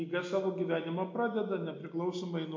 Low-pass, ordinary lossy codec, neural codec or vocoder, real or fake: 7.2 kHz; MP3, 48 kbps; none; real